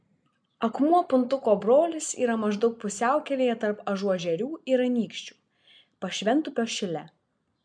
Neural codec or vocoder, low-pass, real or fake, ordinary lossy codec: none; 9.9 kHz; real; MP3, 96 kbps